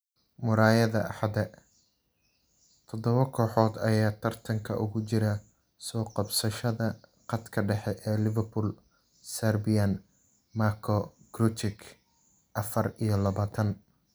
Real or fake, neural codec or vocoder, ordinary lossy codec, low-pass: real; none; none; none